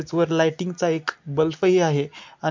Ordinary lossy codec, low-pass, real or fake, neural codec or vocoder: MP3, 48 kbps; 7.2 kHz; real; none